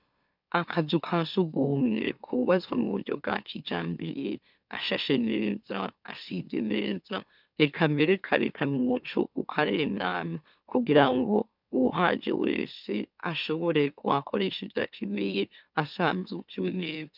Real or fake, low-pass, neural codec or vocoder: fake; 5.4 kHz; autoencoder, 44.1 kHz, a latent of 192 numbers a frame, MeloTTS